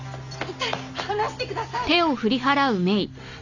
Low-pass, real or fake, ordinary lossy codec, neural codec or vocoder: 7.2 kHz; real; none; none